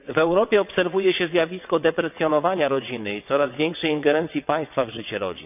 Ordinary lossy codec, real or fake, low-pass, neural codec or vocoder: none; fake; 3.6 kHz; vocoder, 22.05 kHz, 80 mel bands, WaveNeXt